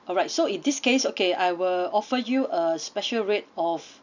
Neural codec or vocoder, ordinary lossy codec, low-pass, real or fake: none; none; 7.2 kHz; real